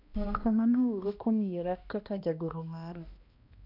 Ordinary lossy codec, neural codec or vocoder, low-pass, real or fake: none; codec, 16 kHz, 1 kbps, X-Codec, HuBERT features, trained on balanced general audio; 5.4 kHz; fake